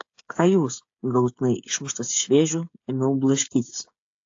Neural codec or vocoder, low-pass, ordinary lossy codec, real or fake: codec, 16 kHz, 8 kbps, FreqCodec, smaller model; 7.2 kHz; AAC, 48 kbps; fake